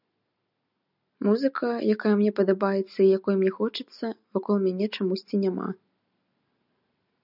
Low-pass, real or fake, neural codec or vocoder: 5.4 kHz; real; none